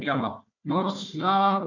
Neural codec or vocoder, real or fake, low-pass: codec, 16 kHz, 1 kbps, FunCodec, trained on Chinese and English, 50 frames a second; fake; 7.2 kHz